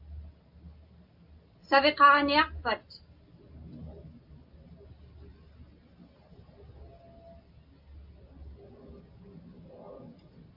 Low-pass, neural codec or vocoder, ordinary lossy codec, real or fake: 5.4 kHz; none; AAC, 48 kbps; real